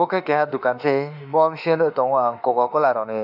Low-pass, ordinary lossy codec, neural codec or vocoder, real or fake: 5.4 kHz; none; autoencoder, 48 kHz, 32 numbers a frame, DAC-VAE, trained on Japanese speech; fake